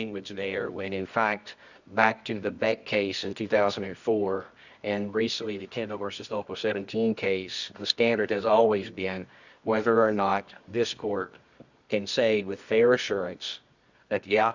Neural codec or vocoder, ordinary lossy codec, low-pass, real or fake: codec, 24 kHz, 0.9 kbps, WavTokenizer, medium music audio release; Opus, 64 kbps; 7.2 kHz; fake